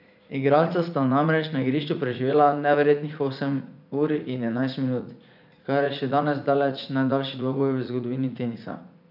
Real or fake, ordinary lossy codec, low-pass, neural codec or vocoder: fake; none; 5.4 kHz; vocoder, 44.1 kHz, 80 mel bands, Vocos